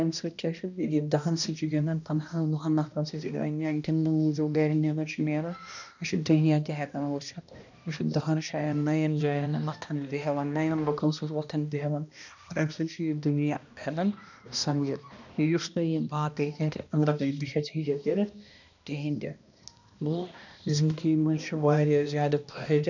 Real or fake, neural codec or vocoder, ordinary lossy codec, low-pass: fake; codec, 16 kHz, 1 kbps, X-Codec, HuBERT features, trained on balanced general audio; none; 7.2 kHz